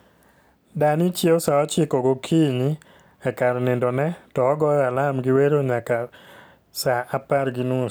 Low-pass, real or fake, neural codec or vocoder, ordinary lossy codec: none; real; none; none